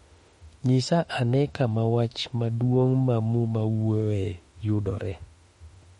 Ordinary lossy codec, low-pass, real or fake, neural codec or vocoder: MP3, 48 kbps; 19.8 kHz; fake; autoencoder, 48 kHz, 32 numbers a frame, DAC-VAE, trained on Japanese speech